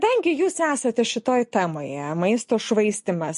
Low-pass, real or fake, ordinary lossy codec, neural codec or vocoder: 14.4 kHz; real; MP3, 48 kbps; none